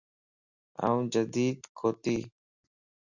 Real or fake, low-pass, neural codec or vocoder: real; 7.2 kHz; none